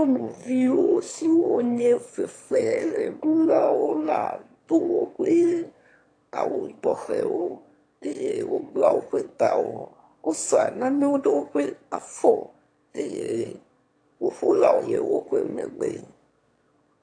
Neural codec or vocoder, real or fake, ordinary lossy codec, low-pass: autoencoder, 22.05 kHz, a latent of 192 numbers a frame, VITS, trained on one speaker; fake; AAC, 48 kbps; 9.9 kHz